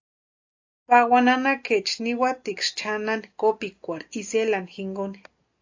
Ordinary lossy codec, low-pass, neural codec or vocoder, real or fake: AAC, 48 kbps; 7.2 kHz; none; real